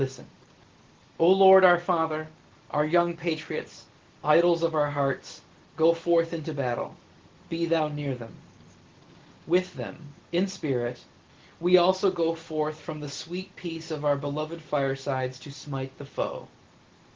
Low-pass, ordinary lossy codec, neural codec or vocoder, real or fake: 7.2 kHz; Opus, 16 kbps; none; real